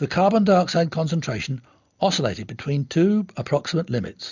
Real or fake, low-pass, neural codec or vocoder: real; 7.2 kHz; none